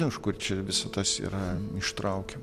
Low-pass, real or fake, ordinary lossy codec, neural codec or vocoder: 14.4 kHz; real; MP3, 96 kbps; none